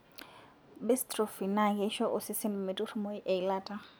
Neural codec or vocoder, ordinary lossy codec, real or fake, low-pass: none; none; real; none